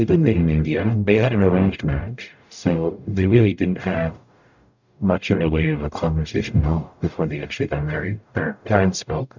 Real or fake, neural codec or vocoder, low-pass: fake; codec, 44.1 kHz, 0.9 kbps, DAC; 7.2 kHz